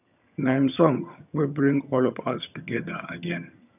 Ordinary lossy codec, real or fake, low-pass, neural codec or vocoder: none; fake; 3.6 kHz; vocoder, 22.05 kHz, 80 mel bands, HiFi-GAN